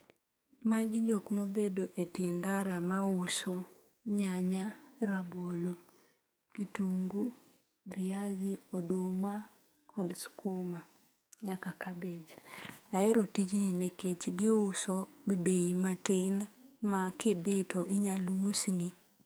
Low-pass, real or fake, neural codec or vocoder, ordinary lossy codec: none; fake; codec, 44.1 kHz, 2.6 kbps, SNAC; none